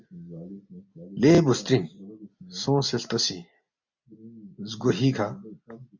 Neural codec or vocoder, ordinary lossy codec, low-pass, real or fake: none; MP3, 64 kbps; 7.2 kHz; real